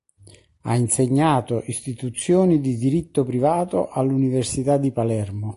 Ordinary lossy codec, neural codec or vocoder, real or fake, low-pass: AAC, 48 kbps; none; real; 10.8 kHz